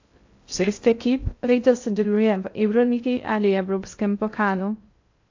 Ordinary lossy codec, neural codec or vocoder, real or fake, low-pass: AAC, 48 kbps; codec, 16 kHz in and 24 kHz out, 0.6 kbps, FocalCodec, streaming, 2048 codes; fake; 7.2 kHz